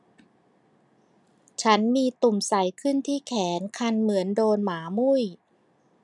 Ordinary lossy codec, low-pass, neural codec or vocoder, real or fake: none; 10.8 kHz; none; real